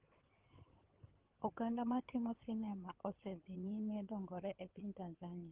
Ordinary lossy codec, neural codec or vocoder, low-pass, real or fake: Opus, 16 kbps; codec, 16 kHz in and 24 kHz out, 2.2 kbps, FireRedTTS-2 codec; 3.6 kHz; fake